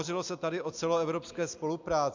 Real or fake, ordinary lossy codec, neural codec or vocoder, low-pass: real; MP3, 64 kbps; none; 7.2 kHz